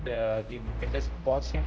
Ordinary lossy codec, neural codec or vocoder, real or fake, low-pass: none; codec, 16 kHz, 0.5 kbps, X-Codec, HuBERT features, trained on general audio; fake; none